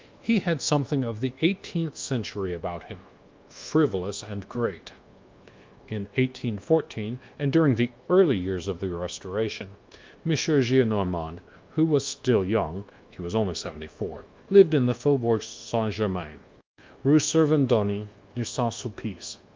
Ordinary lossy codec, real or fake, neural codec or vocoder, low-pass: Opus, 32 kbps; fake; codec, 24 kHz, 1.2 kbps, DualCodec; 7.2 kHz